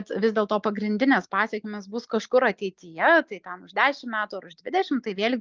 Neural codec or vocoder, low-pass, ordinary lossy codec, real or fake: none; 7.2 kHz; Opus, 24 kbps; real